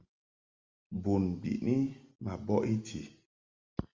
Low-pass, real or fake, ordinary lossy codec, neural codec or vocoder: 7.2 kHz; real; Opus, 32 kbps; none